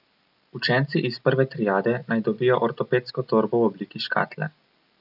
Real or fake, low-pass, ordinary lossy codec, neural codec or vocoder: real; 5.4 kHz; none; none